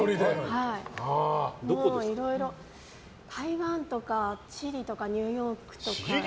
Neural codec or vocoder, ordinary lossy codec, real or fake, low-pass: none; none; real; none